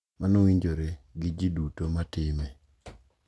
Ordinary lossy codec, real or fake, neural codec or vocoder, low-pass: none; real; none; none